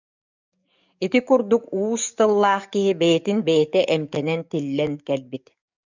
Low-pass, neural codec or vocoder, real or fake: 7.2 kHz; codec, 44.1 kHz, 7.8 kbps, DAC; fake